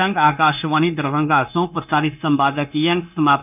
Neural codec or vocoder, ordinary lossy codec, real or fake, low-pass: codec, 16 kHz, 0.9 kbps, LongCat-Audio-Codec; none; fake; 3.6 kHz